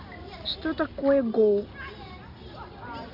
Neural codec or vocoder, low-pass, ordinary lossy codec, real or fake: none; 5.4 kHz; none; real